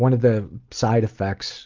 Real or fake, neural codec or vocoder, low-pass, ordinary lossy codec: real; none; 7.2 kHz; Opus, 24 kbps